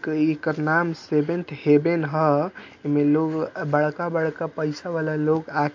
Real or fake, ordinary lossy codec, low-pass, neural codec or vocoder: real; MP3, 48 kbps; 7.2 kHz; none